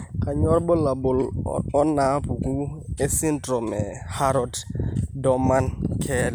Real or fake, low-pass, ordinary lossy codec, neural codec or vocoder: real; none; none; none